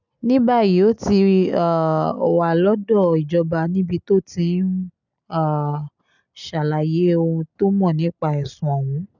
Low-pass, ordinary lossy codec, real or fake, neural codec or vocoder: 7.2 kHz; none; real; none